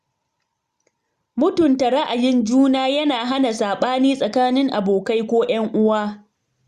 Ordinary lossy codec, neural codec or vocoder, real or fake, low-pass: Opus, 64 kbps; none; real; 14.4 kHz